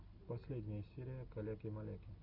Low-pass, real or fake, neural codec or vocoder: 5.4 kHz; real; none